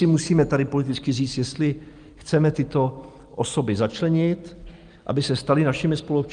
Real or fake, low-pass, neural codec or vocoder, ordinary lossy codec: real; 9.9 kHz; none; Opus, 32 kbps